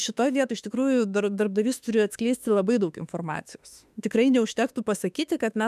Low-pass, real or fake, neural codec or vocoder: 14.4 kHz; fake; autoencoder, 48 kHz, 32 numbers a frame, DAC-VAE, trained on Japanese speech